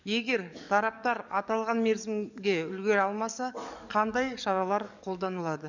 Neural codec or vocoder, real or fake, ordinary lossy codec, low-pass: codec, 44.1 kHz, 7.8 kbps, DAC; fake; none; 7.2 kHz